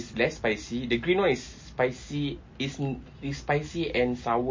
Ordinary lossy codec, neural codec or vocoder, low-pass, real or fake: MP3, 32 kbps; none; 7.2 kHz; real